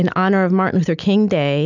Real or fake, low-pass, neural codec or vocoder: real; 7.2 kHz; none